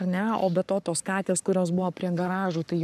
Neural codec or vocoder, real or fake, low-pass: codec, 44.1 kHz, 7.8 kbps, Pupu-Codec; fake; 14.4 kHz